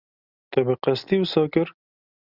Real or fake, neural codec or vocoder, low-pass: real; none; 5.4 kHz